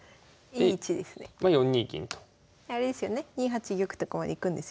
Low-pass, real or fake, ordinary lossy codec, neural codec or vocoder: none; real; none; none